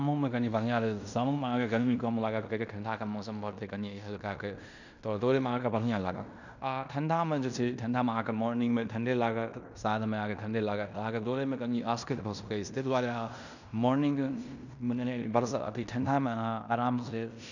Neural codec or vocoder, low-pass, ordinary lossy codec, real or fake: codec, 16 kHz in and 24 kHz out, 0.9 kbps, LongCat-Audio-Codec, fine tuned four codebook decoder; 7.2 kHz; none; fake